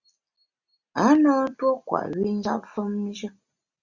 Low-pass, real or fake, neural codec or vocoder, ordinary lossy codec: 7.2 kHz; real; none; Opus, 64 kbps